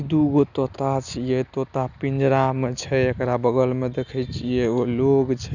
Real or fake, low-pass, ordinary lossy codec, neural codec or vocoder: real; 7.2 kHz; none; none